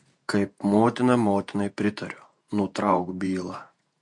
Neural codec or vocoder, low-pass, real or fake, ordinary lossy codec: vocoder, 48 kHz, 128 mel bands, Vocos; 10.8 kHz; fake; MP3, 48 kbps